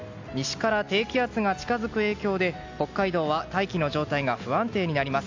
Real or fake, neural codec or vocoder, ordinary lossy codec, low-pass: real; none; AAC, 48 kbps; 7.2 kHz